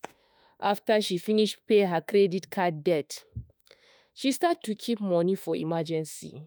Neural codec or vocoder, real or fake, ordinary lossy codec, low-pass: autoencoder, 48 kHz, 32 numbers a frame, DAC-VAE, trained on Japanese speech; fake; none; none